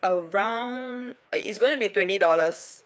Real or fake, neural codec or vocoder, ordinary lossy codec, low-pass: fake; codec, 16 kHz, 2 kbps, FreqCodec, larger model; none; none